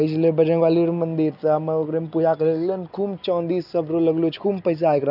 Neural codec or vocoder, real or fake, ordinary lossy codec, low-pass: none; real; none; 5.4 kHz